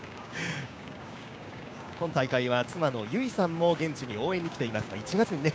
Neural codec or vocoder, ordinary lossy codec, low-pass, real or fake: codec, 16 kHz, 6 kbps, DAC; none; none; fake